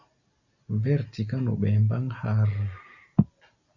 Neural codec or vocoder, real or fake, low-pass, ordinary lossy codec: none; real; 7.2 kHz; Opus, 64 kbps